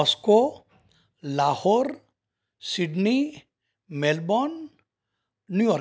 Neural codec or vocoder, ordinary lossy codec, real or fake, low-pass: none; none; real; none